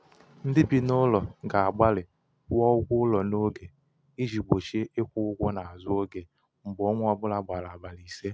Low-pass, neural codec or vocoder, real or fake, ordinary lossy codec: none; none; real; none